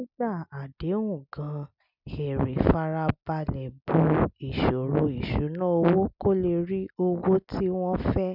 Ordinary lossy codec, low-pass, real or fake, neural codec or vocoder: none; 5.4 kHz; real; none